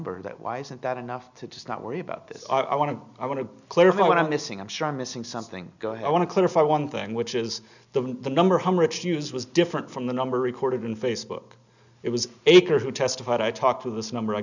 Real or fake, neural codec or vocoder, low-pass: real; none; 7.2 kHz